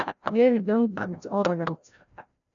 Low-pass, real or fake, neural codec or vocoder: 7.2 kHz; fake; codec, 16 kHz, 0.5 kbps, FreqCodec, larger model